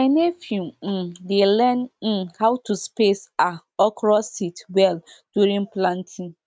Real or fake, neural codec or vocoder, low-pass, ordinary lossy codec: real; none; none; none